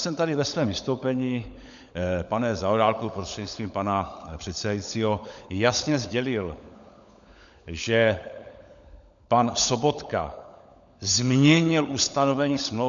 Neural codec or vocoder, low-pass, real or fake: codec, 16 kHz, 16 kbps, FunCodec, trained on LibriTTS, 50 frames a second; 7.2 kHz; fake